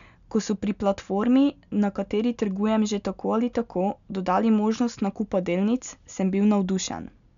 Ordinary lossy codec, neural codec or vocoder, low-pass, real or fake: none; none; 7.2 kHz; real